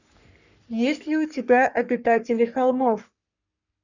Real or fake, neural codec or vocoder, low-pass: fake; codec, 44.1 kHz, 3.4 kbps, Pupu-Codec; 7.2 kHz